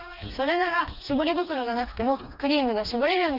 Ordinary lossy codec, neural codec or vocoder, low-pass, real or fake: none; codec, 16 kHz, 2 kbps, FreqCodec, smaller model; 5.4 kHz; fake